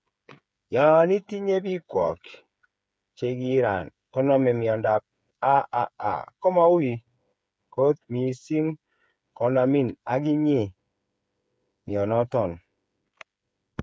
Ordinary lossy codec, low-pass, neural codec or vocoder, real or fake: none; none; codec, 16 kHz, 8 kbps, FreqCodec, smaller model; fake